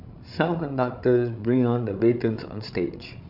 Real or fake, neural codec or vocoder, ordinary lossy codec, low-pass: fake; codec, 16 kHz, 16 kbps, FreqCodec, larger model; none; 5.4 kHz